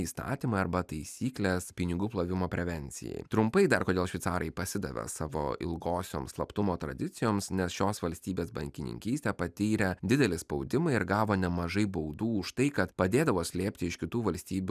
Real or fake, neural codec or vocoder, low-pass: real; none; 14.4 kHz